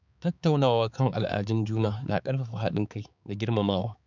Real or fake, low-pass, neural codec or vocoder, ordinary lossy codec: fake; 7.2 kHz; codec, 16 kHz, 4 kbps, X-Codec, HuBERT features, trained on balanced general audio; none